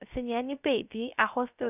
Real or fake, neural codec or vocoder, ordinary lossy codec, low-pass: fake; codec, 16 kHz, 0.3 kbps, FocalCodec; none; 3.6 kHz